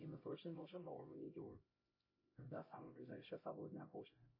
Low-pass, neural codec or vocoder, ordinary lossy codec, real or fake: 5.4 kHz; codec, 16 kHz, 0.5 kbps, X-Codec, HuBERT features, trained on LibriSpeech; MP3, 24 kbps; fake